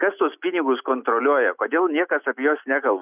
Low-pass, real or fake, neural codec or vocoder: 3.6 kHz; real; none